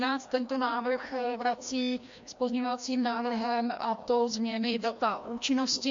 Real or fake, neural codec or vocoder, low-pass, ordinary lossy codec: fake; codec, 16 kHz, 1 kbps, FreqCodec, larger model; 7.2 kHz; MP3, 48 kbps